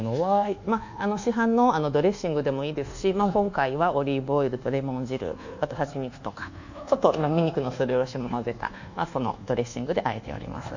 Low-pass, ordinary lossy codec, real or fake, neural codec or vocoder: 7.2 kHz; none; fake; codec, 24 kHz, 1.2 kbps, DualCodec